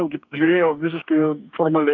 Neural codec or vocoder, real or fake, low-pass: codec, 44.1 kHz, 2.6 kbps, DAC; fake; 7.2 kHz